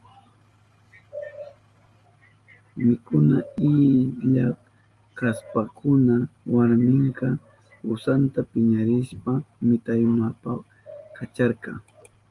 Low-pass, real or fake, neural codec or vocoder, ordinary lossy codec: 10.8 kHz; real; none; Opus, 32 kbps